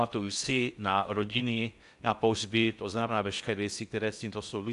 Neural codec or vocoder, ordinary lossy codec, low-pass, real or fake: codec, 16 kHz in and 24 kHz out, 0.6 kbps, FocalCodec, streaming, 4096 codes; AAC, 96 kbps; 10.8 kHz; fake